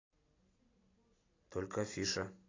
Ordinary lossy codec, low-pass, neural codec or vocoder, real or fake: AAC, 32 kbps; 7.2 kHz; none; real